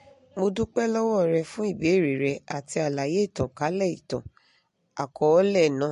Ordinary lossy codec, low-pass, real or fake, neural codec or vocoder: MP3, 48 kbps; 10.8 kHz; real; none